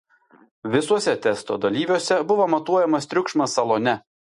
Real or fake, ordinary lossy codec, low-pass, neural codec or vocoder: real; MP3, 48 kbps; 14.4 kHz; none